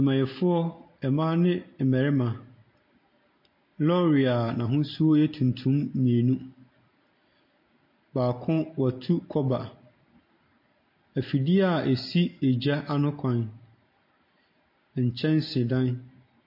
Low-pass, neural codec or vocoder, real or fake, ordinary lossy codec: 5.4 kHz; none; real; MP3, 32 kbps